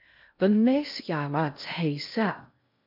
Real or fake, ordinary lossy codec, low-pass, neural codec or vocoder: fake; MP3, 48 kbps; 5.4 kHz; codec, 16 kHz in and 24 kHz out, 0.6 kbps, FocalCodec, streaming, 2048 codes